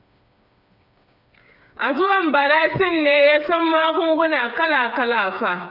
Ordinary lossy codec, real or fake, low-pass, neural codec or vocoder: none; fake; 5.4 kHz; codec, 16 kHz, 4 kbps, FreqCodec, smaller model